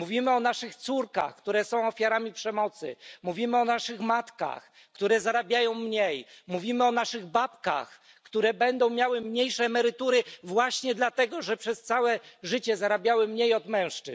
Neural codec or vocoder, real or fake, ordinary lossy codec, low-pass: none; real; none; none